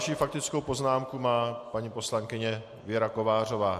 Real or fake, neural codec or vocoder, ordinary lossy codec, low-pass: real; none; MP3, 64 kbps; 14.4 kHz